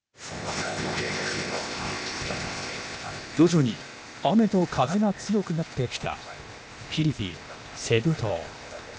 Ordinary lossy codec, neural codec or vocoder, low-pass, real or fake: none; codec, 16 kHz, 0.8 kbps, ZipCodec; none; fake